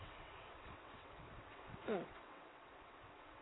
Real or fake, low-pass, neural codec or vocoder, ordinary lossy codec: fake; 7.2 kHz; vocoder, 22.05 kHz, 80 mel bands, WaveNeXt; AAC, 16 kbps